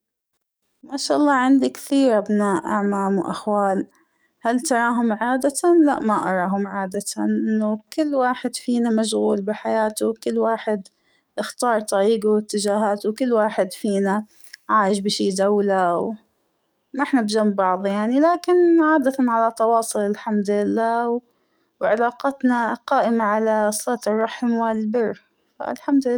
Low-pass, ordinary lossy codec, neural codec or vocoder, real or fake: none; none; codec, 44.1 kHz, 7.8 kbps, DAC; fake